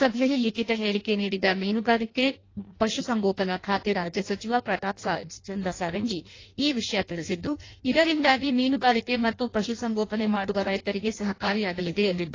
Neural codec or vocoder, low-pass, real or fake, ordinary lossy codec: codec, 16 kHz in and 24 kHz out, 0.6 kbps, FireRedTTS-2 codec; 7.2 kHz; fake; AAC, 32 kbps